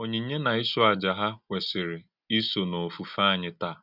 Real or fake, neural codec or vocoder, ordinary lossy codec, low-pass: real; none; none; 5.4 kHz